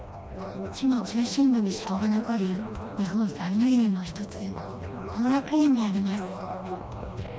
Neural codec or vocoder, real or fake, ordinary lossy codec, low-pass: codec, 16 kHz, 1 kbps, FreqCodec, smaller model; fake; none; none